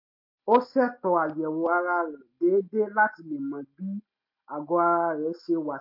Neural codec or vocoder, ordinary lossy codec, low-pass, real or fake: none; MP3, 32 kbps; 5.4 kHz; real